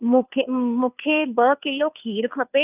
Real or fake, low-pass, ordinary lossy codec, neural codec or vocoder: fake; 3.6 kHz; none; codec, 16 kHz, 2 kbps, FunCodec, trained on Chinese and English, 25 frames a second